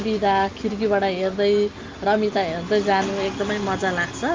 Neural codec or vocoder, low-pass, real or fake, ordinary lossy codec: none; 7.2 kHz; real; Opus, 32 kbps